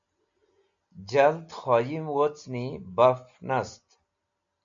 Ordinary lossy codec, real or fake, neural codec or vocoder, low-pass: MP3, 64 kbps; real; none; 7.2 kHz